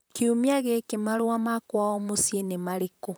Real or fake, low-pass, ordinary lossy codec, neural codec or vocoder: fake; none; none; vocoder, 44.1 kHz, 128 mel bands, Pupu-Vocoder